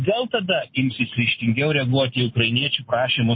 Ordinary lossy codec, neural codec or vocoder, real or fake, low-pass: MP3, 24 kbps; none; real; 7.2 kHz